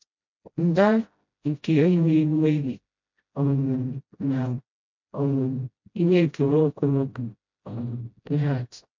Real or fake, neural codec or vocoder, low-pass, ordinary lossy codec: fake; codec, 16 kHz, 0.5 kbps, FreqCodec, smaller model; 7.2 kHz; MP3, 48 kbps